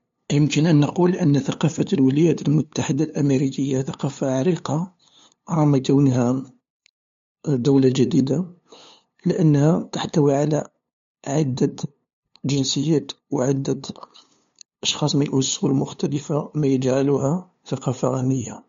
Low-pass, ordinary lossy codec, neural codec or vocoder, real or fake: 7.2 kHz; MP3, 48 kbps; codec, 16 kHz, 2 kbps, FunCodec, trained on LibriTTS, 25 frames a second; fake